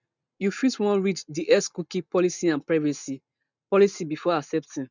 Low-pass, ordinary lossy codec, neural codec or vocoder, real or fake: 7.2 kHz; none; none; real